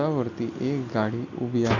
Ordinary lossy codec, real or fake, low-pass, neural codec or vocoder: none; real; 7.2 kHz; none